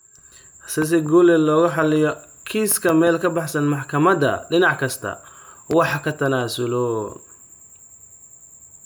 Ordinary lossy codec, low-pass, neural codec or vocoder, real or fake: none; none; none; real